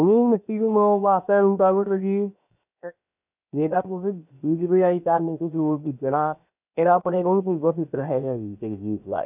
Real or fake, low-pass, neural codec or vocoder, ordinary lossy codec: fake; 3.6 kHz; codec, 16 kHz, 0.7 kbps, FocalCodec; none